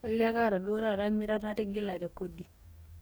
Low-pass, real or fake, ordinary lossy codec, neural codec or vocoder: none; fake; none; codec, 44.1 kHz, 2.6 kbps, DAC